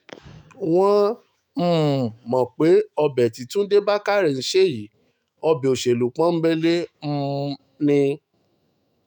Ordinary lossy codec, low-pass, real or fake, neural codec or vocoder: none; 19.8 kHz; fake; autoencoder, 48 kHz, 128 numbers a frame, DAC-VAE, trained on Japanese speech